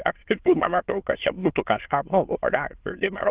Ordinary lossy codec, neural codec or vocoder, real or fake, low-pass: Opus, 16 kbps; autoencoder, 22.05 kHz, a latent of 192 numbers a frame, VITS, trained on many speakers; fake; 3.6 kHz